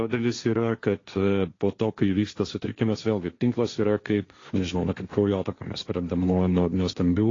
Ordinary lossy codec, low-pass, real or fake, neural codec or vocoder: AAC, 32 kbps; 7.2 kHz; fake; codec, 16 kHz, 1.1 kbps, Voila-Tokenizer